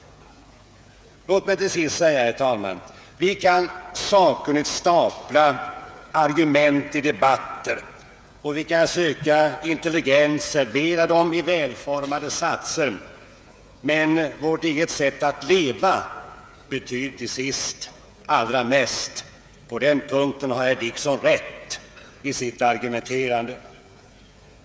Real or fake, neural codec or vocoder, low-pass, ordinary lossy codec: fake; codec, 16 kHz, 8 kbps, FreqCodec, smaller model; none; none